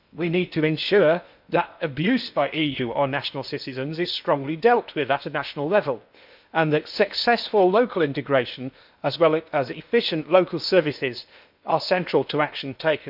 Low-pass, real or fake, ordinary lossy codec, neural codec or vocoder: 5.4 kHz; fake; none; codec, 16 kHz in and 24 kHz out, 0.8 kbps, FocalCodec, streaming, 65536 codes